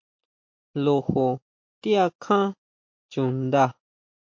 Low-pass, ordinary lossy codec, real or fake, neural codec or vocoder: 7.2 kHz; MP3, 48 kbps; real; none